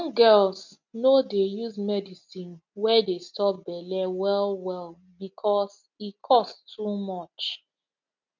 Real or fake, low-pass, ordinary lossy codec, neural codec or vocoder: real; 7.2 kHz; none; none